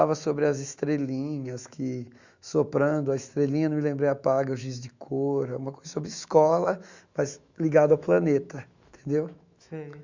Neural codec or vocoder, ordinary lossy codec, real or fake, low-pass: autoencoder, 48 kHz, 128 numbers a frame, DAC-VAE, trained on Japanese speech; Opus, 64 kbps; fake; 7.2 kHz